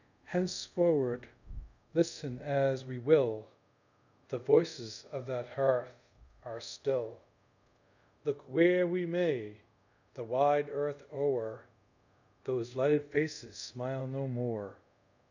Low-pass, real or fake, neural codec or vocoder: 7.2 kHz; fake; codec, 24 kHz, 0.5 kbps, DualCodec